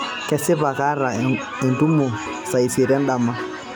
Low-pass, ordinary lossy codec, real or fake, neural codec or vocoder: none; none; real; none